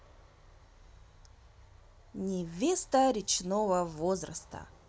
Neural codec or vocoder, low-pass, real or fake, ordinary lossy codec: none; none; real; none